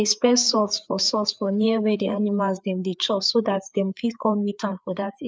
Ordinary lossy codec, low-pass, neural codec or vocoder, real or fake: none; none; codec, 16 kHz, 4 kbps, FreqCodec, larger model; fake